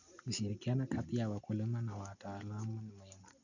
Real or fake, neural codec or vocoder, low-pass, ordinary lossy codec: real; none; 7.2 kHz; none